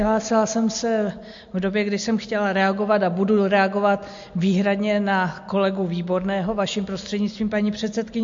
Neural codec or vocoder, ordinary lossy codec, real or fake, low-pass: none; MP3, 48 kbps; real; 7.2 kHz